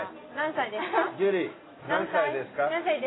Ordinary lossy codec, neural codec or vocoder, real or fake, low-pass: AAC, 16 kbps; none; real; 7.2 kHz